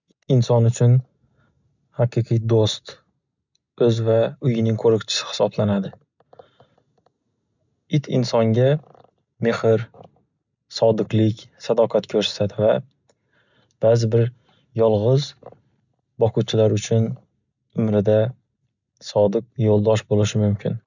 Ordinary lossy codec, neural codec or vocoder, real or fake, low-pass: none; none; real; 7.2 kHz